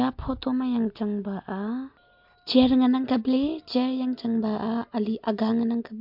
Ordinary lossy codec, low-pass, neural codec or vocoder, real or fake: MP3, 48 kbps; 5.4 kHz; none; real